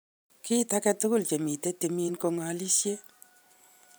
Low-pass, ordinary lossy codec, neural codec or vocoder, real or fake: none; none; vocoder, 44.1 kHz, 128 mel bands every 512 samples, BigVGAN v2; fake